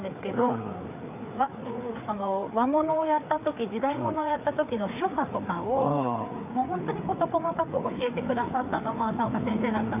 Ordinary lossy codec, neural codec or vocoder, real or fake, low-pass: none; codec, 16 kHz, 4 kbps, FreqCodec, larger model; fake; 3.6 kHz